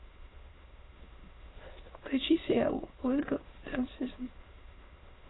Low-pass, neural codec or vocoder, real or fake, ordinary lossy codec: 7.2 kHz; autoencoder, 22.05 kHz, a latent of 192 numbers a frame, VITS, trained on many speakers; fake; AAC, 16 kbps